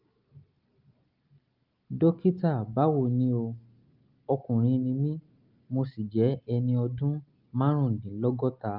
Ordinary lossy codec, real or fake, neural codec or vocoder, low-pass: Opus, 32 kbps; real; none; 5.4 kHz